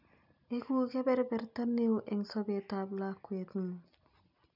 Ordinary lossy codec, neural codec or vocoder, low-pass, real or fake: none; none; 5.4 kHz; real